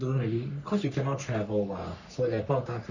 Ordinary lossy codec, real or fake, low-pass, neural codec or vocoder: none; fake; 7.2 kHz; codec, 44.1 kHz, 3.4 kbps, Pupu-Codec